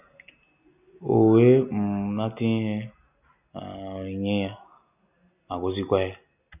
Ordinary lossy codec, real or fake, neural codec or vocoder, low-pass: none; real; none; 3.6 kHz